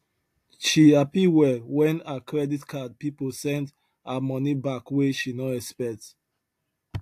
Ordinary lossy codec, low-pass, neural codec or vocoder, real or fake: AAC, 64 kbps; 14.4 kHz; none; real